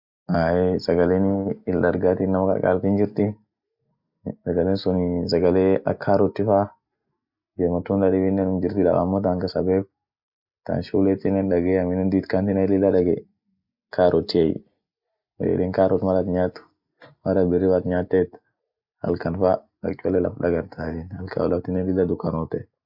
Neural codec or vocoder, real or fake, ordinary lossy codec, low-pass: none; real; Opus, 64 kbps; 5.4 kHz